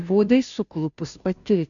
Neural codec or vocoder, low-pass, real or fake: codec, 16 kHz, 0.5 kbps, FunCodec, trained on Chinese and English, 25 frames a second; 7.2 kHz; fake